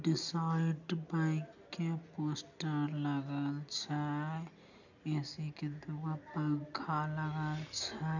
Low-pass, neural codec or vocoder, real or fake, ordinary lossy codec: 7.2 kHz; none; real; none